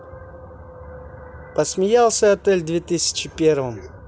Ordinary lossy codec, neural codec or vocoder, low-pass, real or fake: none; none; none; real